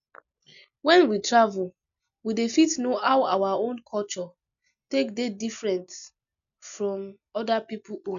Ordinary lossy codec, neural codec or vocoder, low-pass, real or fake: none; none; 7.2 kHz; real